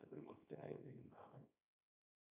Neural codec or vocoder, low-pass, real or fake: codec, 24 kHz, 0.9 kbps, WavTokenizer, small release; 3.6 kHz; fake